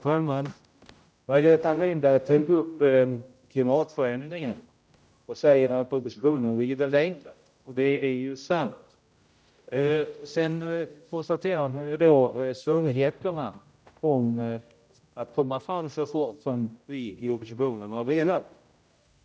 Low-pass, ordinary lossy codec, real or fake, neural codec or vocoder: none; none; fake; codec, 16 kHz, 0.5 kbps, X-Codec, HuBERT features, trained on general audio